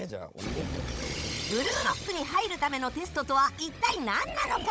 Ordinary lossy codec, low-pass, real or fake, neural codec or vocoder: none; none; fake; codec, 16 kHz, 16 kbps, FunCodec, trained on Chinese and English, 50 frames a second